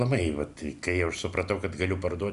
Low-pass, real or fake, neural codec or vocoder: 10.8 kHz; real; none